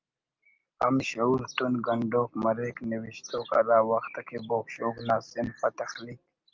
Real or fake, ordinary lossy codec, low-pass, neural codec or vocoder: real; Opus, 24 kbps; 7.2 kHz; none